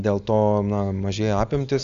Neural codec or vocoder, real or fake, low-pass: none; real; 7.2 kHz